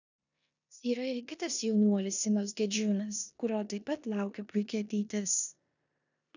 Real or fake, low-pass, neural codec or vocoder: fake; 7.2 kHz; codec, 16 kHz in and 24 kHz out, 0.9 kbps, LongCat-Audio-Codec, four codebook decoder